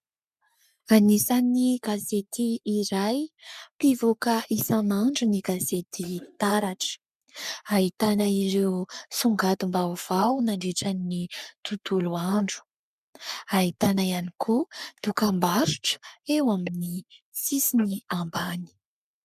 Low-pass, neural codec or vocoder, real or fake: 14.4 kHz; codec, 44.1 kHz, 3.4 kbps, Pupu-Codec; fake